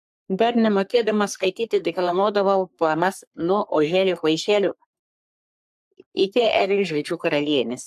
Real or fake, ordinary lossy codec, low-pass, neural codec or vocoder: fake; AAC, 96 kbps; 14.4 kHz; codec, 44.1 kHz, 3.4 kbps, Pupu-Codec